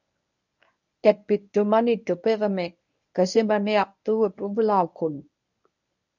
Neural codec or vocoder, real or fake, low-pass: codec, 24 kHz, 0.9 kbps, WavTokenizer, medium speech release version 1; fake; 7.2 kHz